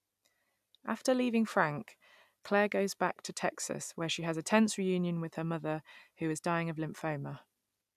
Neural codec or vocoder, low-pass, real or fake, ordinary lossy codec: none; 14.4 kHz; real; none